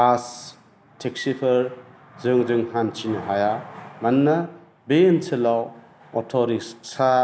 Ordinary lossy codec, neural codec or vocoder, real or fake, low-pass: none; none; real; none